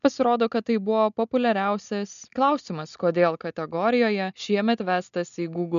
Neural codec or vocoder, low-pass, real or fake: none; 7.2 kHz; real